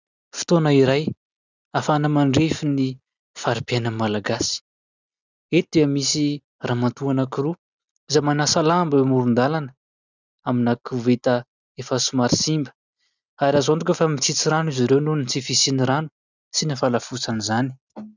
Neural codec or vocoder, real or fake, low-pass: none; real; 7.2 kHz